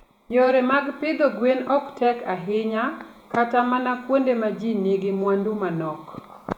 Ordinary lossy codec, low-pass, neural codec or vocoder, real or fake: none; 19.8 kHz; vocoder, 48 kHz, 128 mel bands, Vocos; fake